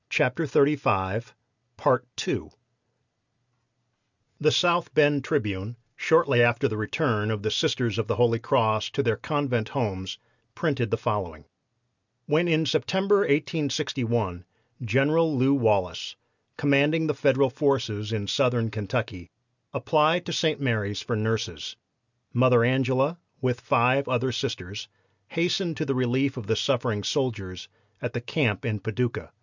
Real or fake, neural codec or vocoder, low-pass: real; none; 7.2 kHz